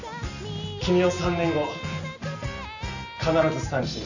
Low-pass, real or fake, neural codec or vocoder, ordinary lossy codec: 7.2 kHz; real; none; none